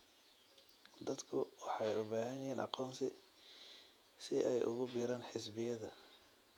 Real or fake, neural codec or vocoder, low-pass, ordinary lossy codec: fake; vocoder, 48 kHz, 128 mel bands, Vocos; 19.8 kHz; none